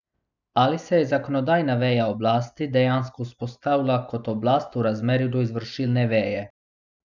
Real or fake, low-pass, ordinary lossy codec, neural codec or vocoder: real; 7.2 kHz; none; none